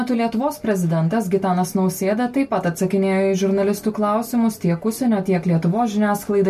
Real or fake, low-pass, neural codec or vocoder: real; 14.4 kHz; none